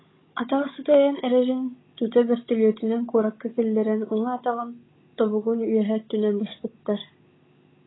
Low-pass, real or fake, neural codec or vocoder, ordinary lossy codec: 7.2 kHz; fake; codec, 16 kHz, 16 kbps, FunCodec, trained on Chinese and English, 50 frames a second; AAC, 16 kbps